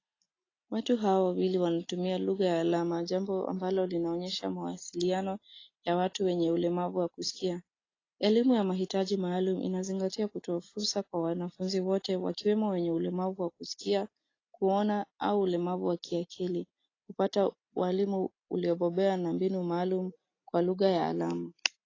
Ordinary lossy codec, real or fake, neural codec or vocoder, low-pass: AAC, 32 kbps; real; none; 7.2 kHz